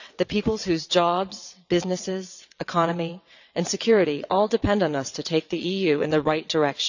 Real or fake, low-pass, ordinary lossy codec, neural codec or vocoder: fake; 7.2 kHz; none; vocoder, 22.05 kHz, 80 mel bands, WaveNeXt